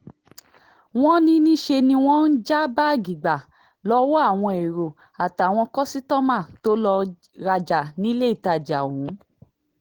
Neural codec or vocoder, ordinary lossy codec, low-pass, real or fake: none; Opus, 16 kbps; 19.8 kHz; real